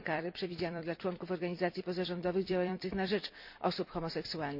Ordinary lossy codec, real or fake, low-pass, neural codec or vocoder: none; fake; 5.4 kHz; vocoder, 44.1 kHz, 128 mel bands every 256 samples, BigVGAN v2